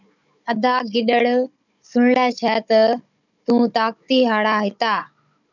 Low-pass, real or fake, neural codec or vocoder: 7.2 kHz; fake; codec, 16 kHz, 16 kbps, FunCodec, trained on Chinese and English, 50 frames a second